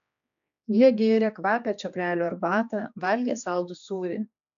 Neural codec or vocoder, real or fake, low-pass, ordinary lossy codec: codec, 16 kHz, 2 kbps, X-Codec, HuBERT features, trained on general audio; fake; 7.2 kHz; AAC, 64 kbps